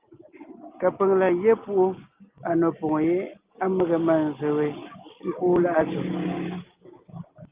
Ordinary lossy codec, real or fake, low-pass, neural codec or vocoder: Opus, 24 kbps; real; 3.6 kHz; none